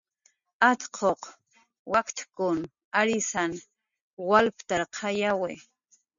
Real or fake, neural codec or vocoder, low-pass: real; none; 7.2 kHz